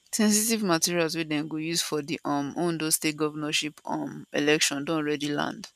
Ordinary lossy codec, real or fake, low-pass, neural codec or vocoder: none; real; 14.4 kHz; none